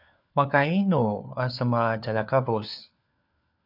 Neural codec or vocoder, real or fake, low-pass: codec, 16 kHz, 4 kbps, FunCodec, trained on LibriTTS, 50 frames a second; fake; 5.4 kHz